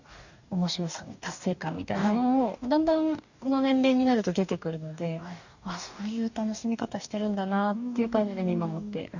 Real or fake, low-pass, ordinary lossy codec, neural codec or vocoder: fake; 7.2 kHz; none; codec, 44.1 kHz, 2.6 kbps, DAC